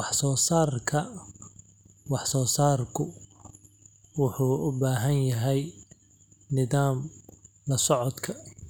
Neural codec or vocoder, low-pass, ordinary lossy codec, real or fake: none; none; none; real